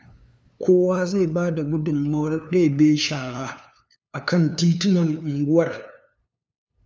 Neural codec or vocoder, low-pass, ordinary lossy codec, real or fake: codec, 16 kHz, 2 kbps, FunCodec, trained on LibriTTS, 25 frames a second; none; none; fake